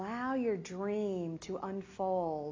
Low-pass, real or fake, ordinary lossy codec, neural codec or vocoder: 7.2 kHz; real; AAC, 32 kbps; none